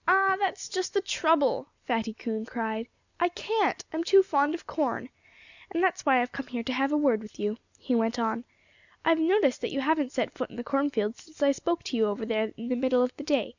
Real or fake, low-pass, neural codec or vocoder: real; 7.2 kHz; none